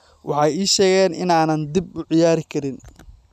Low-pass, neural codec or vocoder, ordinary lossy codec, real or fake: 14.4 kHz; none; none; real